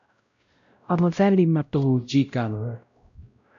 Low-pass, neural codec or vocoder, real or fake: 7.2 kHz; codec, 16 kHz, 0.5 kbps, X-Codec, WavLM features, trained on Multilingual LibriSpeech; fake